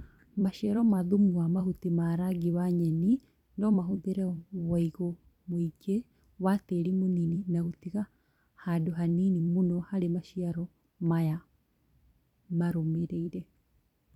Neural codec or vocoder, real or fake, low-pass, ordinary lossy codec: vocoder, 44.1 kHz, 128 mel bands every 256 samples, BigVGAN v2; fake; 19.8 kHz; none